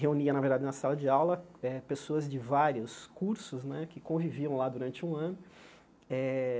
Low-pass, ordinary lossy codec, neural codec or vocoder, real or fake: none; none; none; real